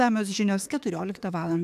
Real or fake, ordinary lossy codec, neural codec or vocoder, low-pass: fake; AAC, 96 kbps; autoencoder, 48 kHz, 32 numbers a frame, DAC-VAE, trained on Japanese speech; 14.4 kHz